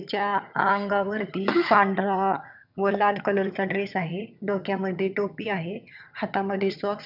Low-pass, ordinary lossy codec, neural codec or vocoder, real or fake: 5.4 kHz; none; vocoder, 22.05 kHz, 80 mel bands, HiFi-GAN; fake